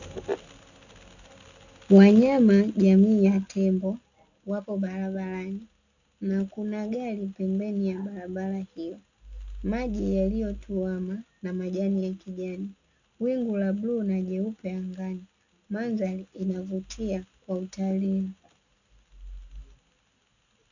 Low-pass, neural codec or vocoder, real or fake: 7.2 kHz; none; real